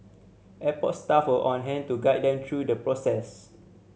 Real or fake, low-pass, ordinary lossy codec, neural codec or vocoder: real; none; none; none